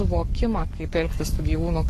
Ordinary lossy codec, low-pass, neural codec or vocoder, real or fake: AAC, 48 kbps; 14.4 kHz; codec, 44.1 kHz, 7.8 kbps, Pupu-Codec; fake